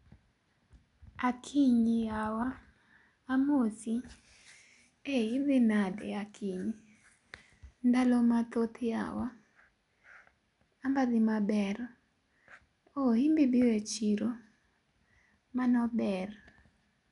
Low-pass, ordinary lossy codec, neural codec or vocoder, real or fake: 10.8 kHz; none; none; real